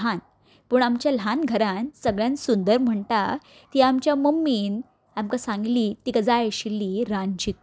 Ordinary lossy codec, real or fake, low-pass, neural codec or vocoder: none; real; none; none